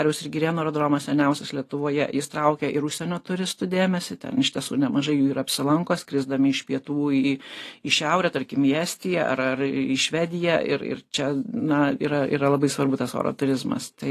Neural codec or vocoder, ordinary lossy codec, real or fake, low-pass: none; AAC, 48 kbps; real; 14.4 kHz